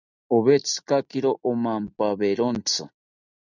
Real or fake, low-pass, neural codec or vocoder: real; 7.2 kHz; none